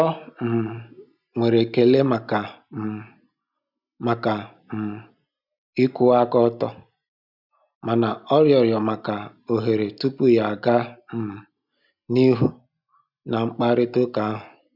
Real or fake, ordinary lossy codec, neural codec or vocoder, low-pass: real; none; none; 5.4 kHz